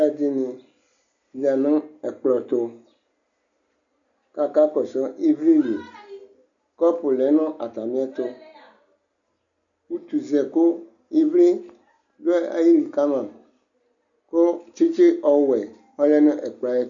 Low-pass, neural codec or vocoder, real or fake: 7.2 kHz; none; real